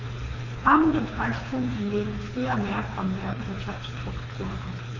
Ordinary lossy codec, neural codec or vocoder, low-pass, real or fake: AAC, 32 kbps; codec, 24 kHz, 3 kbps, HILCodec; 7.2 kHz; fake